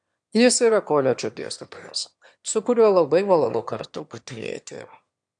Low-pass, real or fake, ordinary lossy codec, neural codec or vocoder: 9.9 kHz; fake; AAC, 64 kbps; autoencoder, 22.05 kHz, a latent of 192 numbers a frame, VITS, trained on one speaker